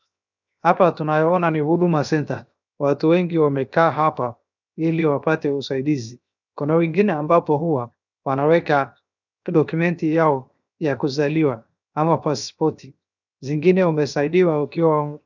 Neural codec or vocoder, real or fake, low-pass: codec, 16 kHz, 0.7 kbps, FocalCodec; fake; 7.2 kHz